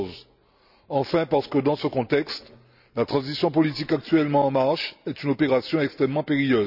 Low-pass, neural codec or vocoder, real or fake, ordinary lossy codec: 5.4 kHz; none; real; none